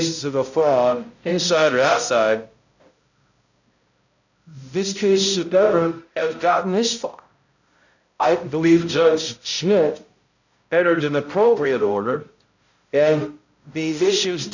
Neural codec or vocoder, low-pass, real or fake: codec, 16 kHz, 0.5 kbps, X-Codec, HuBERT features, trained on balanced general audio; 7.2 kHz; fake